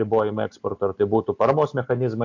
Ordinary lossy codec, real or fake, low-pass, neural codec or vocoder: AAC, 48 kbps; real; 7.2 kHz; none